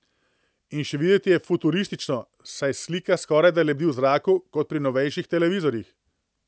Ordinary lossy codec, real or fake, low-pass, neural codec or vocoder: none; real; none; none